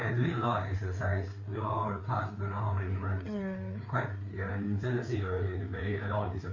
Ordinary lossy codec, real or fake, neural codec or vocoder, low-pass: MP3, 48 kbps; fake; codec, 16 kHz, 4 kbps, FreqCodec, larger model; 7.2 kHz